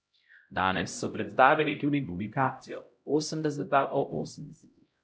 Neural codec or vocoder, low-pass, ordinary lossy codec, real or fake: codec, 16 kHz, 0.5 kbps, X-Codec, HuBERT features, trained on LibriSpeech; none; none; fake